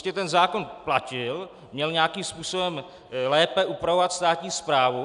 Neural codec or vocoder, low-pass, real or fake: none; 10.8 kHz; real